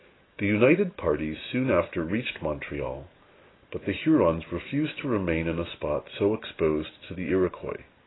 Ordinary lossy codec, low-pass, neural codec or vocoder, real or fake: AAC, 16 kbps; 7.2 kHz; none; real